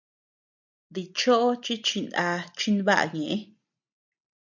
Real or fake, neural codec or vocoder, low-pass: real; none; 7.2 kHz